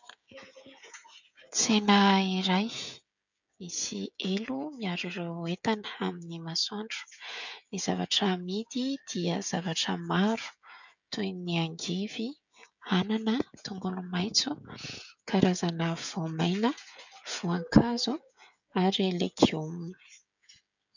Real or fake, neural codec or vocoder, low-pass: fake; codec, 16 kHz, 16 kbps, FreqCodec, smaller model; 7.2 kHz